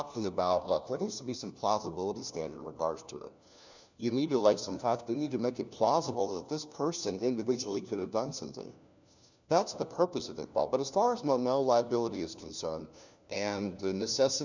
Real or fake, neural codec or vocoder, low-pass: fake; codec, 16 kHz, 1 kbps, FunCodec, trained on LibriTTS, 50 frames a second; 7.2 kHz